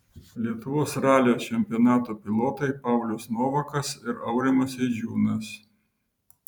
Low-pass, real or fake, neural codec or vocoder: 19.8 kHz; fake; vocoder, 44.1 kHz, 128 mel bands every 512 samples, BigVGAN v2